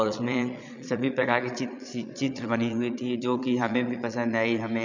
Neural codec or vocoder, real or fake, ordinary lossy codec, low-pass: vocoder, 22.05 kHz, 80 mel bands, Vocos; fake; none; 7.2 kHz